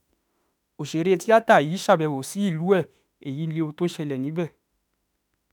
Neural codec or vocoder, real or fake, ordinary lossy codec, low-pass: autoencoder, 48 kHz, 32 numbers a frame, DAC-VAE, trained on Japanese speech; fake; none; none